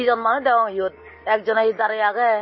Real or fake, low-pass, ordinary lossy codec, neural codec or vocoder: real; 7.2 kHz; MP3, 24 kbps; none